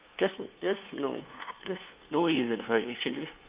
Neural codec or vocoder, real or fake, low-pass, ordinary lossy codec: codec, 16 kHz, 2 kbps, FunCodec, trained on LibriTTS, 25 frames a second; fake; 3.6 kHz; Opus, 32 kbps